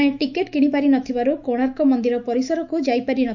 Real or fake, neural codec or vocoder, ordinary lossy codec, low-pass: fake; autoencoder, 48 kHz, 128 numbers a frame, DAC-VAE, trained on Japanese speech; none; 7.2 kHz